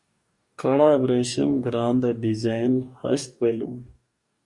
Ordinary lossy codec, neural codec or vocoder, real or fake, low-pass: Opus, 64 kbps; codec, 44.1 kHz, 2.6 kbps, DAC; fake; 10.8 kHz